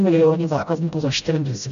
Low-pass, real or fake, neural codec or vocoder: 7.2 kHz; fake; codec, 16 kHz, 0.5 kbps, FreqCodec, smaller model